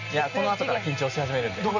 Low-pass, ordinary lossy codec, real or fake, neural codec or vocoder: 7.2 kHz; none; real; none